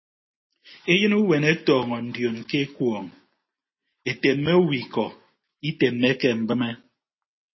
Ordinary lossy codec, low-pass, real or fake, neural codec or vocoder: MP3, 24 kbps; 7.2 kHz; real; none